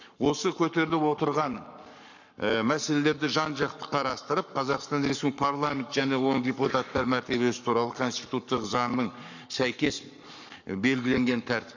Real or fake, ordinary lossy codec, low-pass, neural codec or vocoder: fake; none; 7.2 kHz; codec, 44.1 kHz, 7.8 kbps, Pupu-Codec